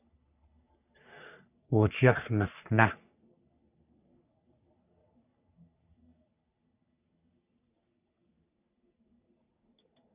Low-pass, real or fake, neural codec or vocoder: 3.6 kHz; fake; codec, 44.1 kHz, 3.4 kbps, Pupu-Codec